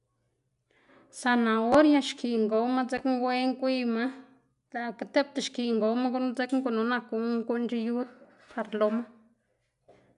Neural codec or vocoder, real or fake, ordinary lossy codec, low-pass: none; real; none; 10.8 kHz